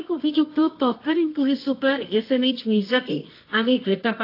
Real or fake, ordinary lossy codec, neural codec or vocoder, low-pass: fake; AAC, 32 kbps; codec, 24 kHz, 0.9 kbps, WavTokenizer, medium music audio release; 5.4 kHz